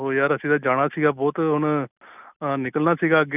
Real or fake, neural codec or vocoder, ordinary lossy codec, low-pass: real; none; none; 3.6 kHz